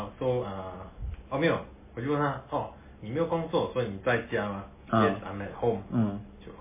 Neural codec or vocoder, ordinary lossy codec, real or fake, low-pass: none; MP3, 16 kbps; real; 3.6 kHz